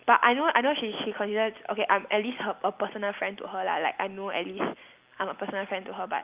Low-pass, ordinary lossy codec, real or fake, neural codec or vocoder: 3.6 kHz; Opus, 32 kbps; real; none